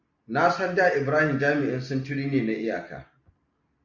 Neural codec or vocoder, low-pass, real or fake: none; 7.2 kHz; real